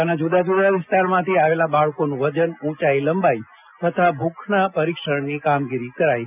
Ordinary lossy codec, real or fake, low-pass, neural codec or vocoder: none; real; 3.6 kHz; none